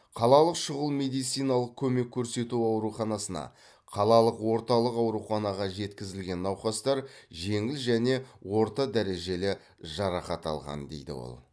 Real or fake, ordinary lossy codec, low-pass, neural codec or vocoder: real; none; none; none